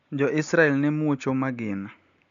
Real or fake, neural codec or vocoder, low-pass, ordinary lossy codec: real; none; 7.2 kHz; none